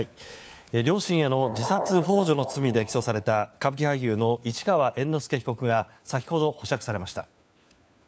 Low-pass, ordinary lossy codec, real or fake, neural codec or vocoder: none; none; fake; codec, 16 kHz, 4 kbps, FunCodec, trained on LibriTTS, 50 frames a second